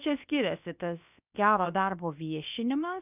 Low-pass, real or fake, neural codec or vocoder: 3.6 kHz; fake; codec, 16 kHz, about 1 kbps, DyCAST, with the encoder's durations